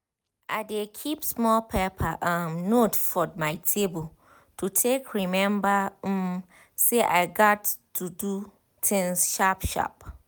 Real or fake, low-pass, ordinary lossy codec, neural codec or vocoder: real; none; none; none